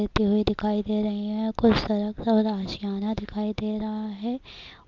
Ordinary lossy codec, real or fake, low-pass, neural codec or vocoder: Opus, 24 kbps; real; 7.2 kHz; none